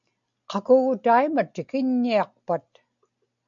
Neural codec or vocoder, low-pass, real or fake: none; 7.2 kHz; real